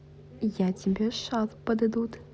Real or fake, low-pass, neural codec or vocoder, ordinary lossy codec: real; none; none; none